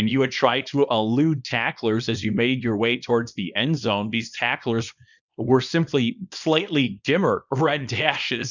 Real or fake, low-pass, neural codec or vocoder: fake; 7.2 kHz; codec, 24 kHz, 0.9 kbps, WavTokenizer, small release